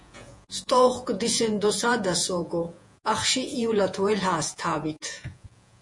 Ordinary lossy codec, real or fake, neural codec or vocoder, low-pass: MP3, 48 kbps; fake; vocoder, 48 kHz, 128 mel bands, Vocos; 10.8 kHz